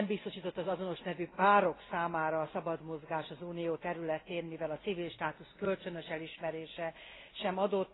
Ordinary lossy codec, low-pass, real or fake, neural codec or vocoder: AAC, 16 kbps; 7.2 kHz; real; none